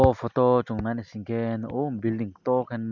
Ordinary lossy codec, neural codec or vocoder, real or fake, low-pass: none; none; real; 7.2 kHz